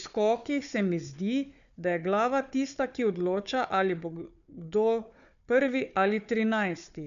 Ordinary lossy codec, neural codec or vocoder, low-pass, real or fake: MP3, 96 kbps; codec, 16 kHz, 4 kbps, FunCodec, trained on Chinese and English, 50 frames a second; 7.2 kHz; fake